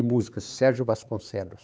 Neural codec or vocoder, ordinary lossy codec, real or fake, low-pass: codec, 16 kHz, 4 kbps, X-Codec, HuBERT features, trained on LibriSpeech; none; fake; none